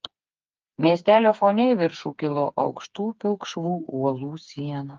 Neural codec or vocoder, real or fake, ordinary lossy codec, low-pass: codec, 16 kHz, 4 kbps, FreqCodec, smaller model; fake; Opus, 24 kbps; 7.2 kHz